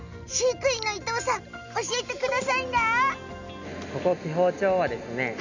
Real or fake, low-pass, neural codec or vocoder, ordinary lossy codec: real; 7.2 kHz; none; none